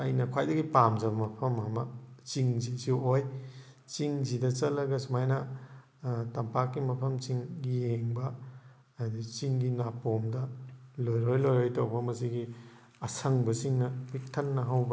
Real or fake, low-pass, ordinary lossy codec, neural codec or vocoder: real; none; none; none